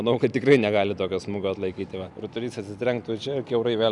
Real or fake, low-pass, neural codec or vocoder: real; 10.8 kHz; none